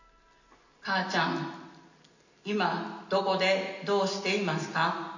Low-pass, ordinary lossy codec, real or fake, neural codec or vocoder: 7.2 kHz; none; real; none